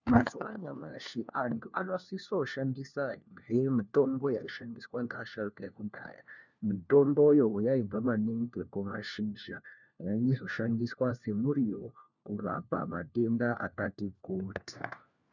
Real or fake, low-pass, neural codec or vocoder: fake; 7.2 kHz; codec, 16 kHz, 1 kbps, FunCodec, trained on LibriTTS, 50 frames a second